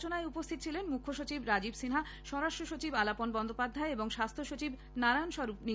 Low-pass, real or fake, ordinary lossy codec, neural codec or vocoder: none; real; none; none